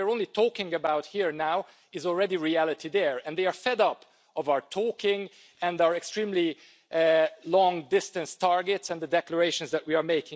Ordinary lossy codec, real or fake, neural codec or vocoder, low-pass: none; real; none; none